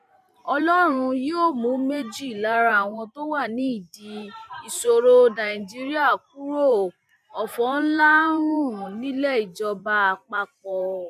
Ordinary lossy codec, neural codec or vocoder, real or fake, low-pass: none; vocoder, 44.1 kHz, 128 mel bands every 512 samples, BigVGAN v2; fake; 14.4 kHz